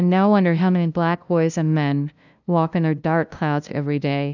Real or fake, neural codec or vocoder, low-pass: fake; codec, 16 kHz, 0.5 kbps, FunCodec, trained on LibriTTS, 25 frames a second; 7.2 kHz